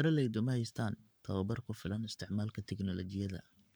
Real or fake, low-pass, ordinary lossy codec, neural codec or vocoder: fake; none; none; codec, 44.1 kHz, 7.8 kbps, Pupu-Codec